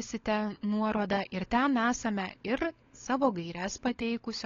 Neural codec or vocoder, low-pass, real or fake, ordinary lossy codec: codec, 16 kHz, 16 kbps, FunCodec, trained on LibriTTS, 50 frames a second; 7.2 kHz; fake; AAC, 32 kbps